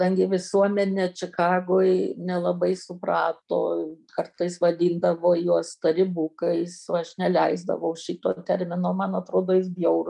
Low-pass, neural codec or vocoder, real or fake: 10.8 kHz; none; real